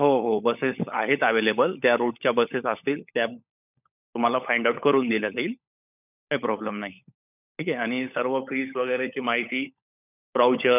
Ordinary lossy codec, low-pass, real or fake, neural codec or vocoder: none; 3.6 kHz; fake; codec, 16 kHz, 16 kbps, FunCodec, trained on LibriTTS, 50 frames a second